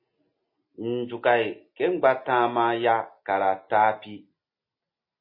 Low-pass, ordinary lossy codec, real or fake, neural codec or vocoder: 5.4 kHz; MP3, 24 kbps; real; none